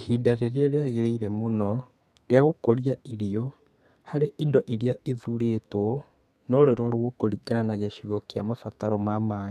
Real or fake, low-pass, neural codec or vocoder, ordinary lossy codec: fake; 14.4 kHz; codec, 32 kHz, 1.9 kbps, SNAC; none